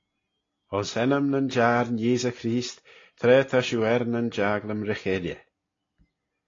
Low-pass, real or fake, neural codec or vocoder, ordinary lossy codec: 7.2 kHz; real; none; AAC, 32 kbps